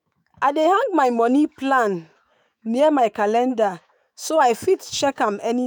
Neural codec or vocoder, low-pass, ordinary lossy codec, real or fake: autoencoder, 48 kHz, 128 numbers a frame, DAC-VAE, trained on Japanese speech; none; none; fake